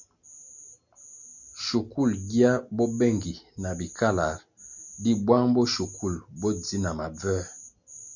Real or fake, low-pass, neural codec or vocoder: real; 7.2 kHz; none